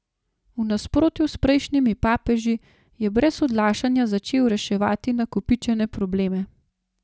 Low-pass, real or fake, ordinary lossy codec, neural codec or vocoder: none; real; none; none